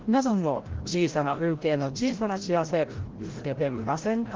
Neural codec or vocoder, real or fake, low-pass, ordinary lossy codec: codec, 16 kHz, 0.5 kbps, FreqCodec, larger model; fake; 7.2 kHz; Opus, 16 kbps